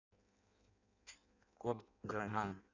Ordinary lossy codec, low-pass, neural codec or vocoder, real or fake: none; 7.2 kHz; codec, 16 kHz in and 24 kHz out, 0.6 kbps, FireRedTTS-2 codec; fake